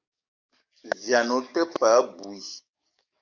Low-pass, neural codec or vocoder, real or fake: 7.2 kHz; codec, 44.1 kHz, 7.8 kbps, DAC; fake